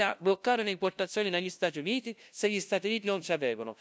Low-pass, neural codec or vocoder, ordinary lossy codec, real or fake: none; codec, 16 kHz, 0.5 kbps, FunCodec, trained on LibriTTS, 25 frames a second; none; fake